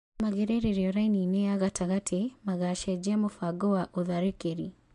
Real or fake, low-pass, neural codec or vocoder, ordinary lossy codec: real; 10.8 kHz; none; MP3, 64 kbps